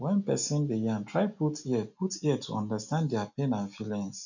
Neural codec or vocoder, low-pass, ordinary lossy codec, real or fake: none; 7.2 kHz; none; real